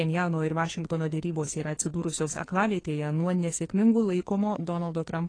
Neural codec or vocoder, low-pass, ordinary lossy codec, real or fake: codec, 44.1 kHz, 2.6 kbps, SNAC; 9.9 kHz; AAC, 32 kbps; fake